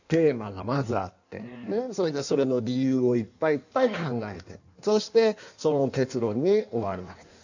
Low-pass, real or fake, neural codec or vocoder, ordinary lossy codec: 7.2 kHz; fake; codec, 16 kHz in and 24 kHz out, 1.1 kbps, FireRedTTS-2 codec; none